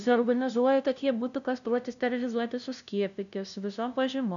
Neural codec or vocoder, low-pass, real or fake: codec, 16 kHz, 0.5 kbps, FunCodec, trained on LibriTTS, 25 frames a second; 7.2 kHz; fake